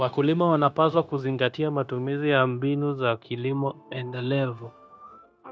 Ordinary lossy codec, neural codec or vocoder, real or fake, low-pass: none; codec, 16 kHz, 0.9 kbps, LongCat-Audio-Codec; fake; none